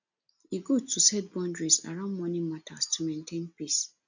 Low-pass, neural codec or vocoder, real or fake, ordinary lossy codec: 7.2 kHz; none; real; none